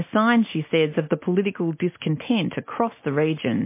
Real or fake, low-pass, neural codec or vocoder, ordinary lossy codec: real; 3.6 kHz; none; MP3, 24 kbps